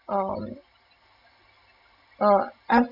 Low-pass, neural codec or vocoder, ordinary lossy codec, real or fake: 5.4 kHz; none; none; real